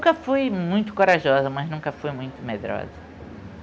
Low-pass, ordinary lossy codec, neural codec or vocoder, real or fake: none; none; none; real